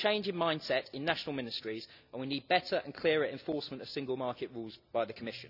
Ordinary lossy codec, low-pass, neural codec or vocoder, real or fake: none; 5.4 kHz; none; real